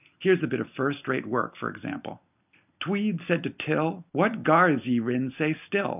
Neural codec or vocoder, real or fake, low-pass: none; real; 3.6 kHz